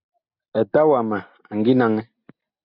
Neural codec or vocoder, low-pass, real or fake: none; 5.4 kHz; real